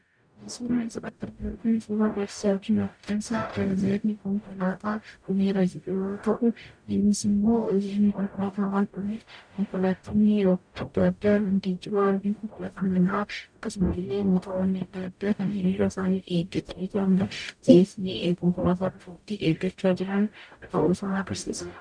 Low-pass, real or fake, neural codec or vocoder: 9.9 kHz; fake; codec, 44.1 kHz, 0.9 kbps, DAC